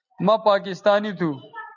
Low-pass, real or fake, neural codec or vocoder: 7.2 kHz; real; none